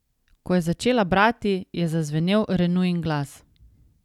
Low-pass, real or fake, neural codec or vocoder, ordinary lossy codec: 19.8 kHz; real; none; none